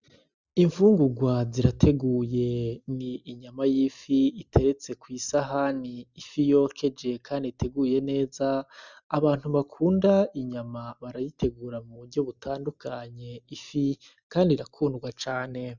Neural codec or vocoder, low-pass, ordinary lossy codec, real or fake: none; 7.2 kHz; Opus, 64 kbps; real